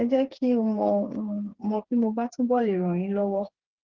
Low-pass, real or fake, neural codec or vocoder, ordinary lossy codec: 7.2 kHz; fake; codec, 16 kHz, 4 kbps, FreqCodec, smaller model; Opus, 16 kbps